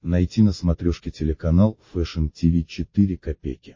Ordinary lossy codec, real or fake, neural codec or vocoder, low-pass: MP3, 32 kbps; real; none; 7.2 kHz